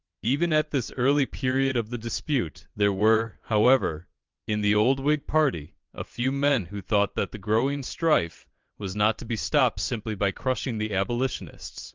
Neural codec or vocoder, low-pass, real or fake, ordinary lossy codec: vocoder, 22.05 kHz, 80 mel bands, WaveNeXt; 7.2 kHz; fake; Opus, 24 kbps